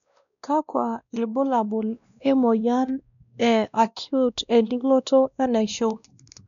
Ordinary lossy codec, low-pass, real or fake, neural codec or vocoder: none; 7.2 kHz; fake; codec, 16 kHz, 1 kbps, X-Codec, WavLM features, trained on Multilingual LibriSpeech